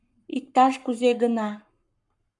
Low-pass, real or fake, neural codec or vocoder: 10.8 kHz; fake; codec, 44.1 kHz, 7.8 kbps, Pupu-Codec